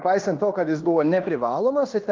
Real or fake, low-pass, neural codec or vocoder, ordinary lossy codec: fake; 7.2 kHz; codec, 16 kHz in and 24 kHz out, 0.9 kbps, LongCat-Audio-Codec, fine tuned four codebook decoder; Opus, 24 kbps